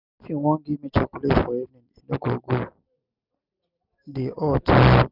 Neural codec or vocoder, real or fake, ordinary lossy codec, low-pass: none; real; none; 5.4 kHz